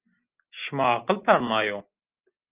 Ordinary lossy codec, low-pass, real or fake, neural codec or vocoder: Opus, 24 kbps; 3.6 kHz; real; none